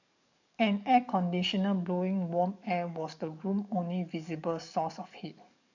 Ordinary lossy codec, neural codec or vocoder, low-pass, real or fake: none; codec, 44.1 kHz, 7.8 kbps, DAC; 7.2 kHz; fake